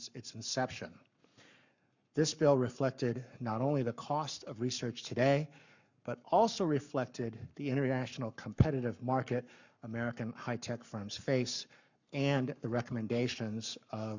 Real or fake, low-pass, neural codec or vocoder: fake; 7.2 kHz; codec, 44.1 kHz, 7.8 kbps, Pupu-Codec